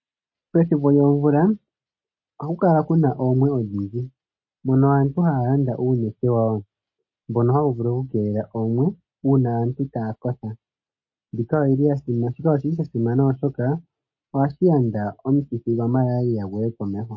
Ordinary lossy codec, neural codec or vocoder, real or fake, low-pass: MP3, 32 kbps; none; real; 7.2 kHz